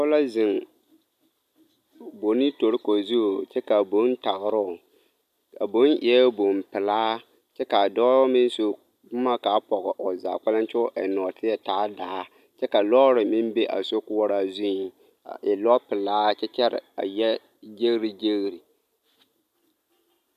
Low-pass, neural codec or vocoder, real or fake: 14.4 kHz; none; real